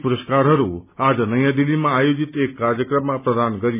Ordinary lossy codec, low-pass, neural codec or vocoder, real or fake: none; 3.6 kHz; none; real